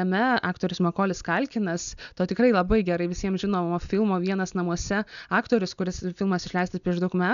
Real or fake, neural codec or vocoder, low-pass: real; none; 7.2 kHz